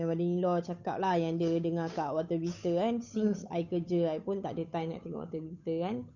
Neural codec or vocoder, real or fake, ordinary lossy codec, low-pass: codec, 16 kHz, 8 kbps, FunCodec, trained on Chinese and English, 25 frames a second; fake; none; 7.2 kHz